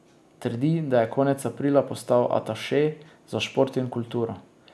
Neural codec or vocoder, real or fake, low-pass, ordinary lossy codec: none; real; none; none